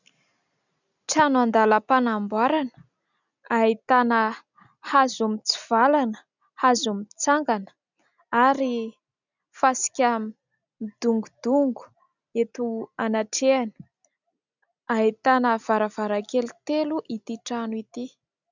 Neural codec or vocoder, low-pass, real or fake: none; 7.2 kHz; real